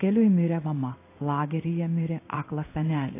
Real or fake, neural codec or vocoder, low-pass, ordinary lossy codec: fake; codec, 16 kHz in and 24 kHz out, 1 kbps, XY-Tokenizer; 3.6 kHz; AAC, 24 kbps